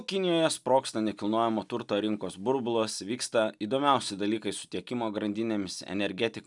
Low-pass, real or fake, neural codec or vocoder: 10.8 kHz; real; none